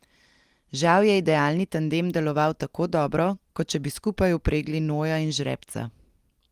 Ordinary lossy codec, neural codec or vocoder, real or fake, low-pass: Opus, 24 kbps; none; real; 14.4 kHz